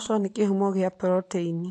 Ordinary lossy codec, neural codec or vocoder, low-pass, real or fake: AAC, 48 kbps; none; 10.8 kHz; real